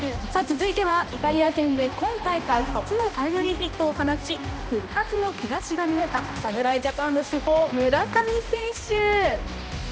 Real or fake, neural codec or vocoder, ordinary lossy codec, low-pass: fake; codec, 16 kHz, 1 kbps, X-Codec, HuBERT features, trained on balanced general audio; none; none